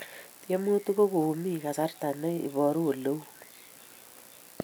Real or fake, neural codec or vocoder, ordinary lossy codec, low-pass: real; none; none; none